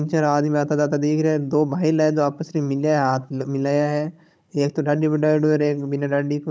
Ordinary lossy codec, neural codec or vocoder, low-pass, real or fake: none; codec, 16 kHz, 16 kbps, FunCodec, trained on Chinese and English, 50 frames a second; none; fake